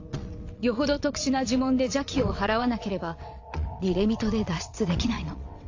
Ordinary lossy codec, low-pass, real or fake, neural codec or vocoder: AAC, 48 kbps; 7.2 kHz; fake; vocoder, 22.05 kHz, 80 mel bands, WaveNeXt